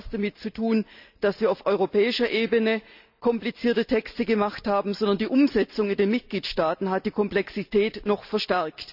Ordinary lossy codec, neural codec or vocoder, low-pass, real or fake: none; none; 5.4 kHz; real